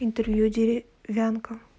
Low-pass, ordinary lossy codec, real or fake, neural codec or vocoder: none; none; real; none